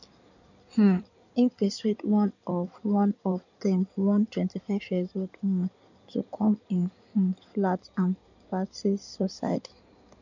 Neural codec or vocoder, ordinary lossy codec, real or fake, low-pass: codec, 16 kHz in and 24 kHz out, 2.2 kbps, FireRedTTS-2 codec; none; fake; 7.2 kHz